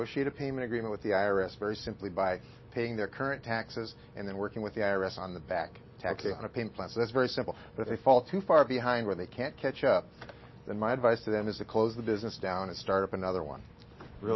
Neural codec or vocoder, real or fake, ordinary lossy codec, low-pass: none; real; MP3, 24 kbps; 7.2 kHz